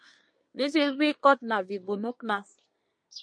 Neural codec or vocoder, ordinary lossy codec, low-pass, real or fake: codec, 24 kHz, 1 kbps, SNAC; MP3, 48 kbps; 9.9 kHz; fake